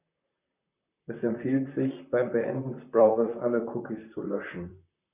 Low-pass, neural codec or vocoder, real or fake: 3.6 kHz; vocoder, 44.1 kHz, 128 mel bands, Pupu-Vocoder; fake